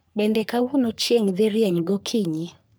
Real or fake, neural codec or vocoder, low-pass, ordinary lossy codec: fake; codec, 44.1 kHz, 3.4 kbps, Pupu-Codec; none; none